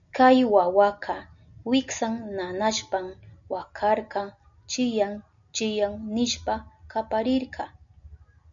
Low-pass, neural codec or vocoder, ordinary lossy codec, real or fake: 7.2 kHz; none; MP3, 96 kbps; real